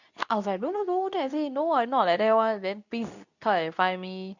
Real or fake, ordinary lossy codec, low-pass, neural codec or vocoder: fake; none; 7.2 kHz; codec, 24 kHz, 0.9 kbps, WavTokenizer, medium speech release version 2